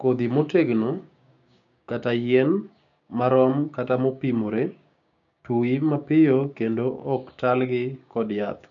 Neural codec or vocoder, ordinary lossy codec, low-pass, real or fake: codec, 16 kHz, 6 kbps, DAC; none; 7.2 kHz; fake